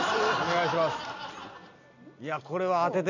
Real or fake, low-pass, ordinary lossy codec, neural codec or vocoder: real; 7.2 kHz; none; none